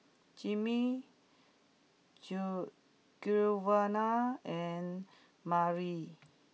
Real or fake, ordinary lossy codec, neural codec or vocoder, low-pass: real; none; none; none